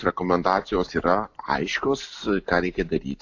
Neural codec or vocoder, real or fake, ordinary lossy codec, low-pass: none; real; AAC, 48 kbps; 7.2 kHz